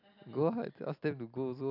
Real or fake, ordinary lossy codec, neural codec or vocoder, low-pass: real; none; none; 5.4 kHz